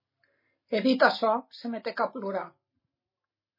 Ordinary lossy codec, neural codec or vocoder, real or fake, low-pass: MP3, 24 kbps; none; real; 5.4 kHz